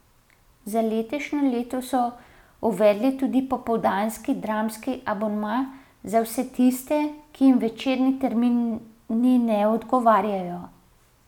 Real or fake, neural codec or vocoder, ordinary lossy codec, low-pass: real; none; none; 19.8 kHz